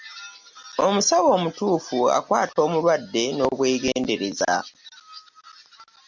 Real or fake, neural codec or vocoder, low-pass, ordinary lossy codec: real; none; 7.2 kHz; MP3, 64 kbps